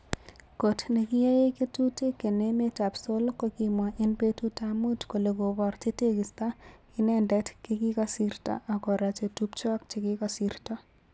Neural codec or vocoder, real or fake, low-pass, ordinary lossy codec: none; real; none; none